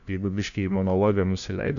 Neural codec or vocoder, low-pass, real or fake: codec, 16 kHz, 1 kbps, FunCodec, trained on LibriTTS, 50 frames a second; 7.2 kHz; fake